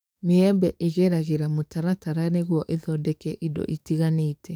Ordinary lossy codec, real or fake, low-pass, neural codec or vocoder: none; fake; none; codec, 44.1 kHz, 7.8 kbps, DAC